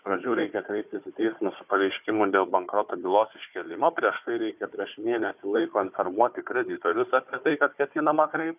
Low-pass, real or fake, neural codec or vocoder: 3.6 kHz; fake; codec, 16 kHz, 4 kbps, FunCodec, trained on Chinese and English, 50 frames a second